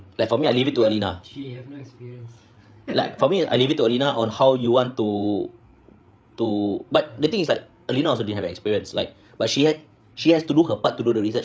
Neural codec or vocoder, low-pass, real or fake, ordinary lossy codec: codec, 16 kHz, 8 kbps, FreqCodec, larger model; none; fake; none